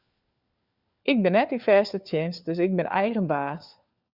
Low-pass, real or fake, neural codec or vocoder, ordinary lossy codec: 5.4 kHz; fake; codec, 16 kHz, 4 kbps, FunCodec, trained on LibriTTS, 50 frames a second; Opus, 64 kbps